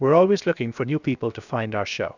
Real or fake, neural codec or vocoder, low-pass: fake; codec, 16 kHz, 0.7 kbps, FocalCodec; 7.2 kHz